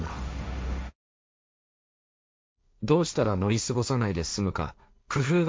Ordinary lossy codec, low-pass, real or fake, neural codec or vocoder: none; none; fake; codec, 16 kHz, 1.1 kbps, Voila-Tokenizer